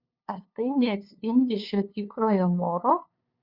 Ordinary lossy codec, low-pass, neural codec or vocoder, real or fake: Opus, 64 kbps; 5.4 kHz; codec, 16 kHz, 2 kbps, FunCodec, trained on LibriTTS, 25 frames a second; fake